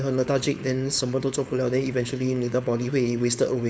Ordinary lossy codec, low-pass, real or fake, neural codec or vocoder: none; none; fake; codec, 16 kHz, 4.8 kbps, FACodec